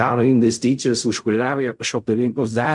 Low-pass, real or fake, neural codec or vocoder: 10.8 kHz; fake; codec, 16 kHz in and 24 kHz out, 0.4 kbps, LongCat-Audio-Codec, fine tuned four codebook decoder